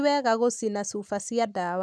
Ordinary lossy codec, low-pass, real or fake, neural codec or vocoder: none; none; real; none